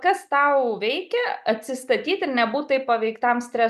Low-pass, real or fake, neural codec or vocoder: 14.4 kHz; real; none